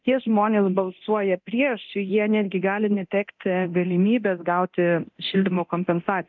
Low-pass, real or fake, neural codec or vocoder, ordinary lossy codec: 7.2 kHz; fake; codec, 24 kHz, 0.9 kbps, DualCodec; MP3, 64 kbps